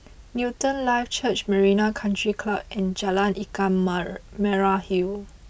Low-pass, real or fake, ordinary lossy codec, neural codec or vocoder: none; real; none; none